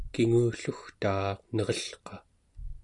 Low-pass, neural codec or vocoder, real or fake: 10.8 kHz; none; real